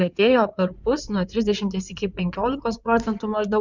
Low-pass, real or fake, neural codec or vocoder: 7.2 kHz; fake; codec, 16 kHz in and 24 kHz out, 2.2 kbps, FireRedTTS-2 codec